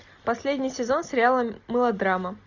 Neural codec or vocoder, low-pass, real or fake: none; 7.2 kHz; real